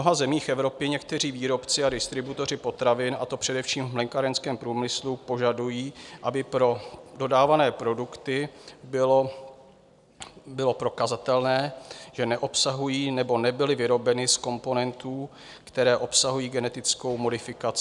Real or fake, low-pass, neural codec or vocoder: real; 10.8 kHz; none